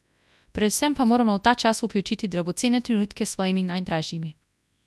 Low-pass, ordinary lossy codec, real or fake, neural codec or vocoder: none; none; fake; codec, 24 kHz, 0.9 kbps, WavTokenizer, large speech release